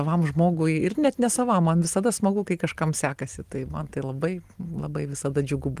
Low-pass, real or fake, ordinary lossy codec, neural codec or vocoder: 14.4 kHz; real; Opus, 24 kbps; none